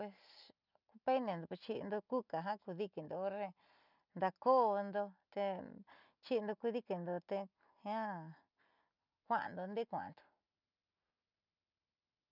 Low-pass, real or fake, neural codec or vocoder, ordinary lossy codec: 5.4 kHz; real; none; none